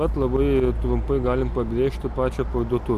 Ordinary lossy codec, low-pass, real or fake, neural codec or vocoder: MP3, 96 kbps; 14.4 kHz; real; none